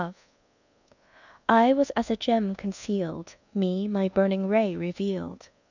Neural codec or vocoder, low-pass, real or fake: codec, 24 kHz, 1.2 kbps, DualCodec; 7.2 kHz; fake